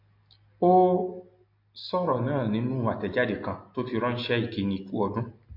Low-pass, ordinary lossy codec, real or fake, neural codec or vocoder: 5.4 kHz; MP3, 32 kbps; real; none